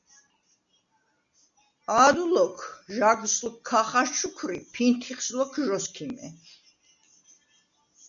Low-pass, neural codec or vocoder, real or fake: 7.2 kHz; none; real